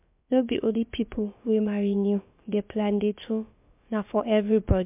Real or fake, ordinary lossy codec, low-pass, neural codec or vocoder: fake; MP3, 32 kbps; 3.6 kHz; codec, 16 kHz, about 1 kbps, DyCAST, with the encoder's durations